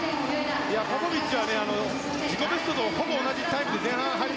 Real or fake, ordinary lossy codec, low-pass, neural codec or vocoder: real; none; none; none